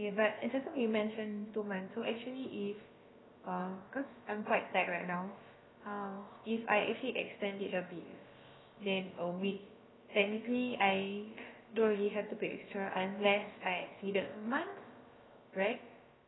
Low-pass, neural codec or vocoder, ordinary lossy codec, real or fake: 7.2 kHz; codec, 16 kHz, about 1 kbps, DyCAST, with the encoder's durations; AAC, 16 kbps; fake